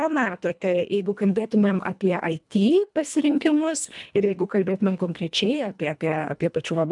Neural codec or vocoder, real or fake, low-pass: codec, 24 kHz, 1.5 kbps, HILCodec; fake; 10.8 kHz